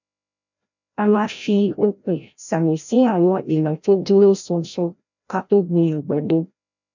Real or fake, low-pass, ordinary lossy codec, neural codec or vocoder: fake; 7.2 kHz; none; codec, 16 kHz, 0.5 kbps, FreqCodec, larger model